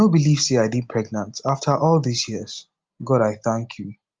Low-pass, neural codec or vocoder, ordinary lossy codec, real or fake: 7.2 kHz; none; Opus, 32 kbps; real